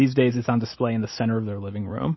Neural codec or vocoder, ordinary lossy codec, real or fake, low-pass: none; MP3, 24 kbps; real; 7.2 kHz